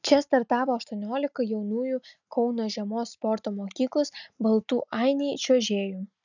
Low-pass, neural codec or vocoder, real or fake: 7.2 kHz; none; real